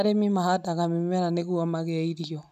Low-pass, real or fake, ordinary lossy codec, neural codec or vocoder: 14.4 kHz; real; none; none